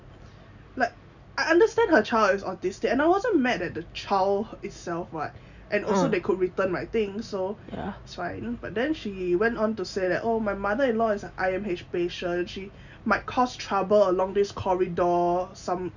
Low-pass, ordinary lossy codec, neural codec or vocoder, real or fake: 7.2 kHz; none; none; real